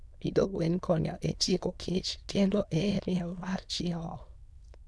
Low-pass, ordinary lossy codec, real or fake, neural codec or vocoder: none; none; fake; autoencoder, 22.05 kHz, a latent of 192 numbers a frame, VITS, trained on many speakers